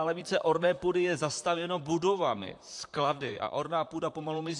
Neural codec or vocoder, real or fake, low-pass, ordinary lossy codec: vocoder, 44.1 kHz, 128 mel bands, Pupu-Vocoder; fake; 10.8 kHz; AAC, 64 kbps